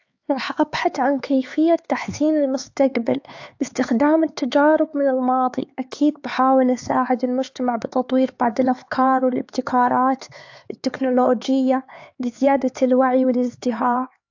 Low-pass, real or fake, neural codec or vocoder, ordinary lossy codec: 7.2 kHz; fake; codec, 16 kHz, 4 kbps, X-Codec, HuBERT features, trained on LibriSpeech; AAC, 48 kbps